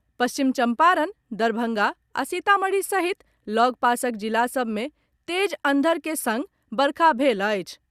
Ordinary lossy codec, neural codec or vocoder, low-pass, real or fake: Opus, 64 kbps; none; 14.4 kHz; real